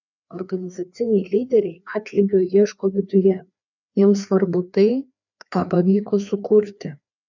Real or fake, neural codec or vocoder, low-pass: fake; codec, 16 kHz, 2 kbps, FreqCodec, larger model; 7.2 kHz